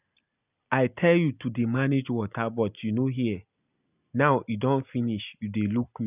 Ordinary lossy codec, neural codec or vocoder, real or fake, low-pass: none; none; real; 3.6 kHz